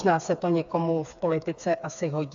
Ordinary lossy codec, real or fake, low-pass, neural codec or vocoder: AAC, 64 kbps; fake; 7.2 kHz; codec, 16 kHz, 4 kbps, FreqCodec, smaller model